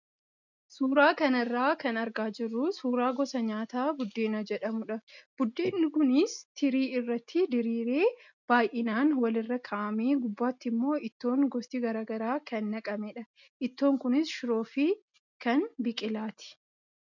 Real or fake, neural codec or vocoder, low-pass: real; none; 7.2 kHz